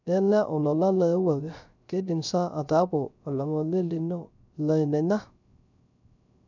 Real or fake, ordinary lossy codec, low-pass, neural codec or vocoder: fake; none; 7.2 kHz; codec, 16 kHz, 0.3 kbps, FocalCodec